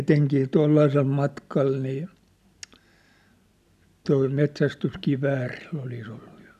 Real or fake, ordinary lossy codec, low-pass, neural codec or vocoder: real; none; 14.4 kHz; none